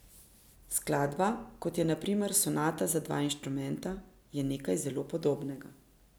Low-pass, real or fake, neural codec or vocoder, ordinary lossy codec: none; real; none; none